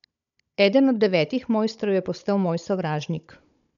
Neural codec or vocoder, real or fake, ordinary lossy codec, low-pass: codec, 16 kHz, 16 kbps, FunCodec, trained on Chinese and English, 50 frames a second; fake; none; 7.2 kHz